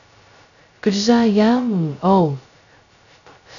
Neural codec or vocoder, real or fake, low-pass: codec, 16 kHz, 0.2 kbps, FocalCodec; fake; 7.2 kHz